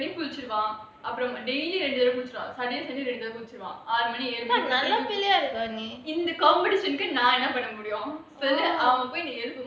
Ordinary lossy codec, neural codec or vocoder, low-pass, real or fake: none; none; none; real